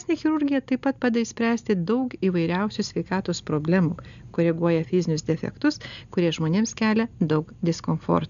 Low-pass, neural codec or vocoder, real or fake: 7.2 kHz; none; real